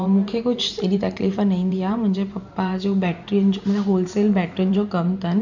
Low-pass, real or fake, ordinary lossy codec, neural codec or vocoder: 7.2 kHz; fake; none; vocoder, 44.1 kHz, 128 mel bands every 512 samples, BigVGAN v2